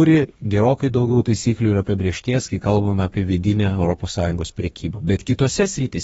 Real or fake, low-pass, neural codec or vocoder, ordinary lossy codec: fake; 14.4 kHz; codec, 32 kHz, 1.9 kbps, SNAC; AAC, 24 kbps